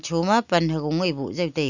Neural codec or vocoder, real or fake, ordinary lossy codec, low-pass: none; real; none; 7.2 kHz